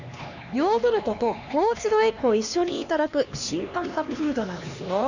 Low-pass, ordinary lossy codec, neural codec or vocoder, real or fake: 7.2 kHz; none; codec, 16 kHz, 2 kbps, X-Codec, HuBERT features, trained on LibriSpeech; fake